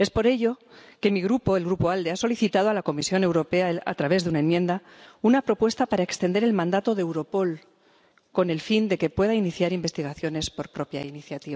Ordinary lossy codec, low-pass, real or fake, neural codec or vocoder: none; none; real; none